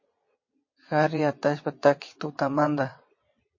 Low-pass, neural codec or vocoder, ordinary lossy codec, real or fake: 7.2 kHz; vocoder, 22.05 kHz, 80 mel bands, WaveNeXt; MP3, 32 kbps; fake